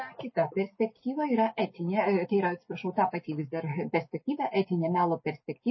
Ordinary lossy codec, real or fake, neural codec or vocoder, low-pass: MP3, 24 kbps; real; none; 7.2 kHz